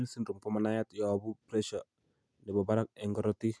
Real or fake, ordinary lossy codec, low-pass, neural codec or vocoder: real; none; none; none